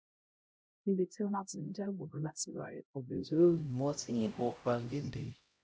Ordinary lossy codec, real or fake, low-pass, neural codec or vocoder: none; fake; none; codec, 16 kHz, 0.5 kbps, X-Codec, HuBERT features, trained on LibriSpeech